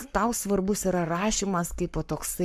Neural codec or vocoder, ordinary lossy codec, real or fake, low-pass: codec, 44.1 kHz, 7.8 kbps, Pupu-Codec; MP3, 96 kbps; fake; 14.4 kHz